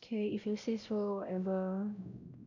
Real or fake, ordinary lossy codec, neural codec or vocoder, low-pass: fake; none; codec, 16 kHz, 0.5 kbps, X-Codec, WavLM features, trained on Multilingual LibriSpeech; 7.2 kHz